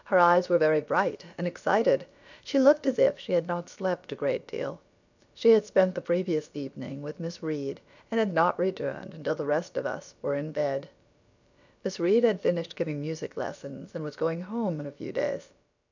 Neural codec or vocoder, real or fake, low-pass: codec, 16 kHz, about 1 kbps, DyCAST, with the encoder's durations; fake; 7.2 kHz